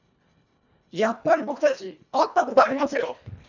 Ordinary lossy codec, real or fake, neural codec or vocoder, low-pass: none; fake; codec, 24 kHz, 1.5 kbps, HILCodec; 7.2 kHz